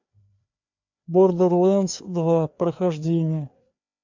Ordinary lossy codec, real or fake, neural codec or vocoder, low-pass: MP3, 64 kbps; fake; codec, 16 kHz, 2 kbps, FreqCodec, larger model; 7.2 kHz